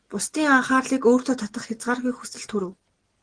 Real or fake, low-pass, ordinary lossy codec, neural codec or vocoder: real; 9.9 kHz; Opus, 16 kbps; none